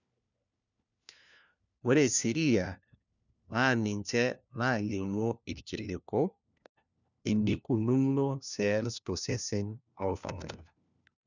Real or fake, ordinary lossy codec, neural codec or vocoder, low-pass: fake; none; codec, 16 kHz, 1 kbps, FunCodec, trained on LibriTTS, 50 frames a second; 7.2 kHz